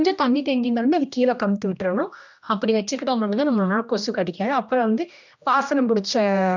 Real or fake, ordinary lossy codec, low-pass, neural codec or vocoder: fake; none; 7.2 kHz; codec, 16 kHz, 1 kbps, X-Codec, HuBERT features, trained on general audio